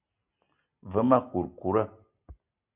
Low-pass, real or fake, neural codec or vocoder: 3.6 kHz; real; none